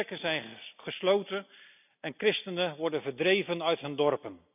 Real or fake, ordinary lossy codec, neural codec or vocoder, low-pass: real; none; none; 3.6 kHz